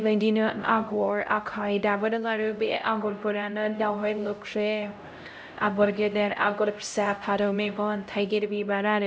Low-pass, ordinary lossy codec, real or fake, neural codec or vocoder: none; none; fake; codec, 16 kHz, 0.5 kbps, X-Codec, HuBERT features, trained on LibriSpeech